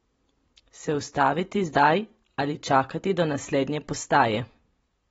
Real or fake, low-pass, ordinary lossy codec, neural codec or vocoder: real; 19.8 kHz; AAC, 24 kbps; none